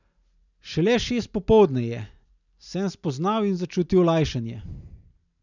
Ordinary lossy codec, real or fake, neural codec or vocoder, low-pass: none; real; none; 7.2 kHz